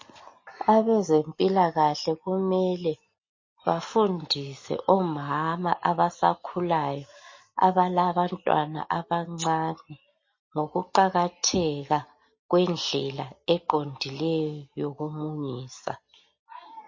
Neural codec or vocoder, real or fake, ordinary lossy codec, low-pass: none; real; MP3, 32 kbps; 7.2 kHz